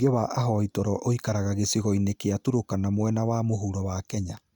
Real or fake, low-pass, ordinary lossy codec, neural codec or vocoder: real; 19.8 kHz; Opus, 64 kbps; none